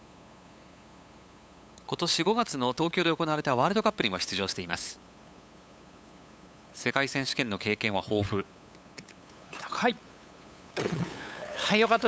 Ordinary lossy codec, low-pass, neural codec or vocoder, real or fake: none; none; codec, 16 kHz, 8 kbps, FunCodec, trained on LibriTTS, 25 frames a second; fake